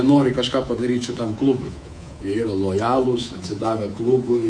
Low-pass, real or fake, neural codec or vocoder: 9.9 kHz; fake; codec, 24 kHz, 3.1 kbps, DualCodec